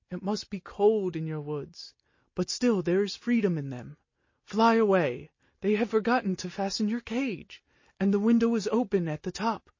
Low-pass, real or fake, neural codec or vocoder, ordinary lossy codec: 7.2 kHz; real; none; MP3, 32 kbps